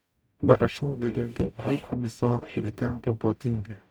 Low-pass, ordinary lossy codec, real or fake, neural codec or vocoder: none; none; fake; codec, 44.1 kHz, 0.9 kbps, DAC